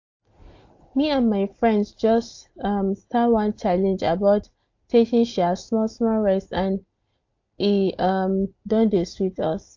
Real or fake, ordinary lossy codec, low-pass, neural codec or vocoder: real; AAC, 48 kbps; 7.2 kHz; none